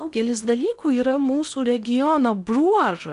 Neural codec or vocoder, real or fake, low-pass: codec, 16 kHz in and 24 kHz out, 0.8 kbps, FocalCodec, streaming, 65536 codes; fake; 10.8 kHz